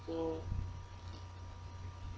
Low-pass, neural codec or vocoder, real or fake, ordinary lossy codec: none; none; real; none